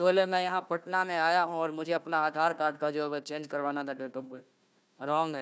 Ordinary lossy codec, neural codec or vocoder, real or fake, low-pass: none; codec, 16 kHz, 1 kbps, FunCodec, trained on Chinese and English, 50 frames a second; fake; none